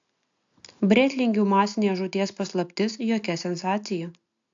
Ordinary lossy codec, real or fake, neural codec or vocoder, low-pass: AAC, 64 kbps; real; none; 7.2 kHz